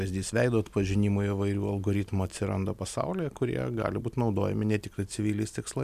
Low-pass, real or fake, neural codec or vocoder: 14.4 kHz; real; none